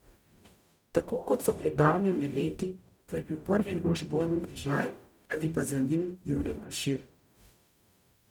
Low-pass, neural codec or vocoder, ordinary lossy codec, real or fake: 19.8 kHz; codec, 44.1 kHz, 0.9 kbps, DAC; none; fake